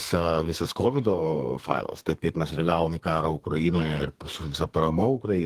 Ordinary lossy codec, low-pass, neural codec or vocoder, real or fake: Opus, 24 kbps; 14.4 kHz; codec, 32 kHz, 1.9 kbps, SNAC; fake